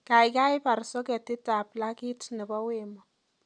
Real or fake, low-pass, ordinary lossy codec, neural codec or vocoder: real; 9.9 kHz; none; none